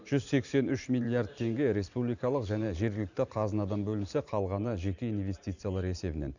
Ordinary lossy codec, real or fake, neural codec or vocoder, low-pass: none; fake; vocoder, 44.1 kHz, 128 mel bands every 512 samples, BigVGAN v2; 7.2 kHz